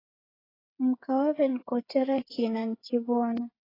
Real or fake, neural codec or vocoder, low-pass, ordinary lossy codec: fake; codec, 16 kHz, 8 kbps, FreqCodec, smaller model; 5.4 kHz; AAC, 24 kbps